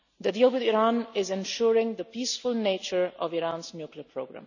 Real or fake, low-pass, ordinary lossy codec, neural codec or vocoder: real; 7.2 kHz; none; none